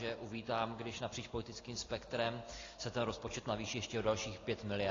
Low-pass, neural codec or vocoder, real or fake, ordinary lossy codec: 7.2 kHz; none; real; AAC, 32 kbps